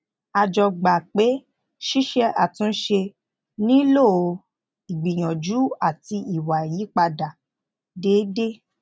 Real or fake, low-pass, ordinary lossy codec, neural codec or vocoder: real; none; none; none